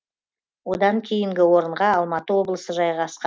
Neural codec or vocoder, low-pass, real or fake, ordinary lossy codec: none; none; real; none